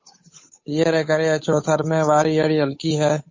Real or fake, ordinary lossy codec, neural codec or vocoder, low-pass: fake; MP3, 32 kbps; codec, 16 kHz, 8 kbps, FunCodec, trained on Chinese and English, 25 frames a second; 7.2 kHz